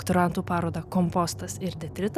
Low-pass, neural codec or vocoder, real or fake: 14.4 kHz; none; real